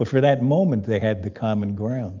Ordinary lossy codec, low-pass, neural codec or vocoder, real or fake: Opus, 24 kbps; 7.2 kHz; none; real